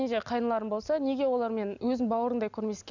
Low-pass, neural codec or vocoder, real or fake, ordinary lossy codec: 7.2 kHz; none; real; none